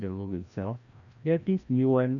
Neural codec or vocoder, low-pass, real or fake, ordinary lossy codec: codec, 16 kHz, 1 kbps, FreqCodec, larger model; 7.2 kHz; fake; none